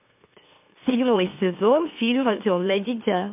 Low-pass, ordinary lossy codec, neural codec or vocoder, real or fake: 3.6 kHz; MP3, 32 kbps; autoencoder, 44.1 kHz, a latent of 192 numbers a frame, MeloTTS; fake